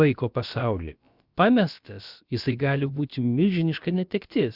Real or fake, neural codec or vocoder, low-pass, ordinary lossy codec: fake; codec, 16 kHz, about 1 kbps, DyCAST, with the encoder's durations; 5.4 kHz; Opus, 64 kbps